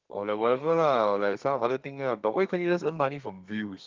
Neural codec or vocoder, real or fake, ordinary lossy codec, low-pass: codec, 32 kHz, 1.9 kbps, SNAC; fake; Opus, 24 kbps; 7.2 kHz